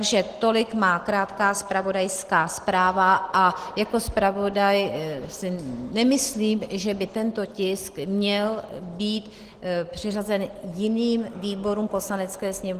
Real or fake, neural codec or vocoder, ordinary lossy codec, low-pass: real; none; Opus, 16 kbps; 14.4 kHz